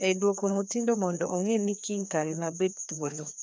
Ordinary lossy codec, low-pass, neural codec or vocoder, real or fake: none; none; codec, 16 kHz, 2 kbps, FreqCodec, larger model; fake